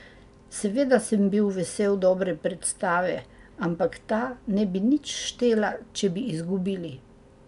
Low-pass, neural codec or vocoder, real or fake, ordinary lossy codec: 10.8 kHz; none; real; none